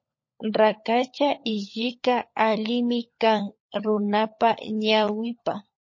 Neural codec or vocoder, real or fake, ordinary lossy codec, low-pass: codec, 16 kHz, 16 kbps, FunCodec, trained on LibriTTS, 50 frames a second; fake; MP3, 32 kbps; 7.2 kHz